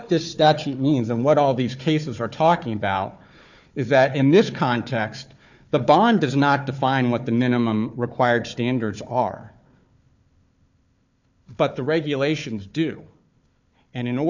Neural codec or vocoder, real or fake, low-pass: codec, 16 kHz, 4 kbps, FunCodec, trained on Chinese and English, 50 frames a second; fake; 7.2 kHz